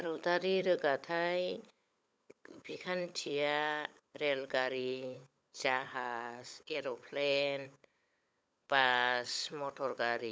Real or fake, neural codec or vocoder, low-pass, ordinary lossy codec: fake; codec, 16 kHz, 16 kbps, FunCodec, trained on LibriTTS, 50 frames a second; none; none